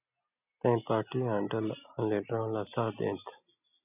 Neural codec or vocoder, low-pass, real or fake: none; 3.6 kHz; real